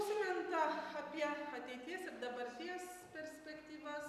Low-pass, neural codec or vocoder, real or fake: 14.4 kHz; none; real